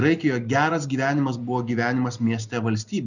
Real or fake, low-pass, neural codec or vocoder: real; 7.2 kHz; none